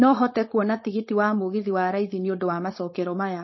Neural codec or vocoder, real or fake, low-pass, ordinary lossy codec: codec, 16 kHz, 4.8 kbps, FACodec; fake; 7.2 kHz; MP3, 24 kbps